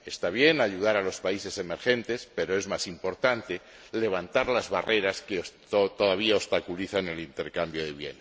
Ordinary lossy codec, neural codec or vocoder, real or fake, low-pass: none; none; real; none